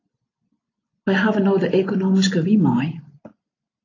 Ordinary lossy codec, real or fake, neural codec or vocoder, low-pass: AAC, 32 kbps; real; none; 7.2 kHz